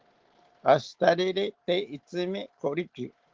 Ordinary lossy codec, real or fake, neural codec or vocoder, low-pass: Opus, 16 kbps; real; none; 7.2 kHz